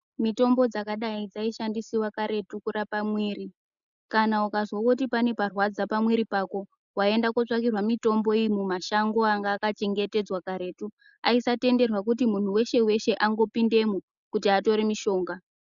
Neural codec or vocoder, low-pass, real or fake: none; 7.2 kHz; real